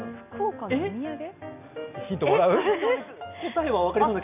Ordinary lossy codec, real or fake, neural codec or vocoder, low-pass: none; real; none; 3.6 kHz